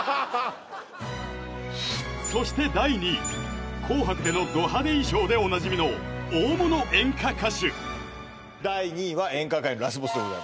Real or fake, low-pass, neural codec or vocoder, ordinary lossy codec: real; none; none; none